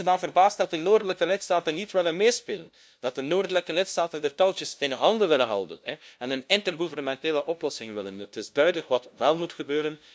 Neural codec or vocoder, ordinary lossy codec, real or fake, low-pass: codec, 16 kHz, 0.5 kbps, FunCodec, trained on LibriTTS, 25 frames a second; none; fake; none